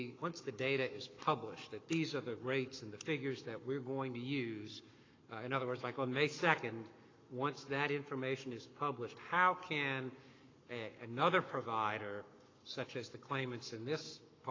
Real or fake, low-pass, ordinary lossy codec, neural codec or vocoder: fake; 7.2 kHz; AAC, 32 kbps; codec, 44.1 kHz, 7.8 kbps, Pupu-Codec